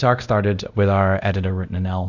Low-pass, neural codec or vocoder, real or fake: 7.2 kHz; codec, 24 kHz, 0.5 kbps, DualCodec; fake